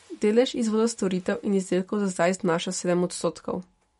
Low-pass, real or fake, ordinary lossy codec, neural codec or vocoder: 19.8 kHz; real; MP3, 48 kbps; none